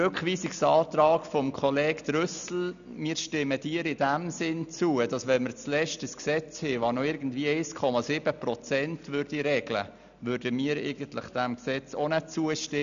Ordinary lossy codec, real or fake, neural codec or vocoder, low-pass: none; real; none; 7.2 kHz